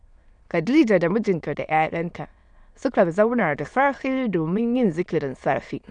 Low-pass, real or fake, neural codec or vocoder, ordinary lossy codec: 9.9 kHz; fake; autoencoder, 22.05 kHz, a latent of 192 numbers a frame, VITS, trained on many speakers; none